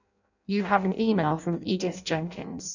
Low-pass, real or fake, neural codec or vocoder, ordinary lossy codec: 7.2 kHz; fake; codec, 16 kHz in and 24 kHz out, 0.6 kbps, FireRedTTS-2 codec; none